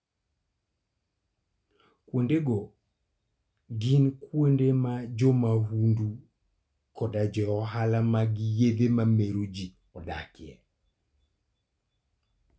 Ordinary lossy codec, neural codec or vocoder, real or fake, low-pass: none; none; real; none